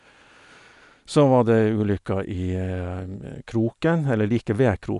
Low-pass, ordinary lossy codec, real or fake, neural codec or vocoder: 10.8 kHz; none; real; none